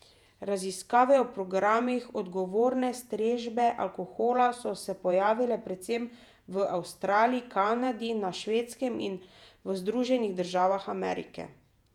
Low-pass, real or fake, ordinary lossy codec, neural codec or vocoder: 19.8 kHz; fake; none; vocoder, 48 kHz, 128 mel bands, Vocos